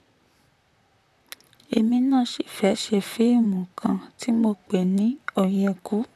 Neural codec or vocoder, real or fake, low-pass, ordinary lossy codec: vocoder, 44.1 kHz, 128 mel bands, Pupu-Vocoder; fake; 14.4 kHz; none